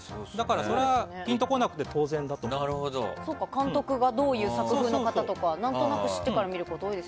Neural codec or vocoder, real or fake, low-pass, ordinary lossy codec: none; real; none; none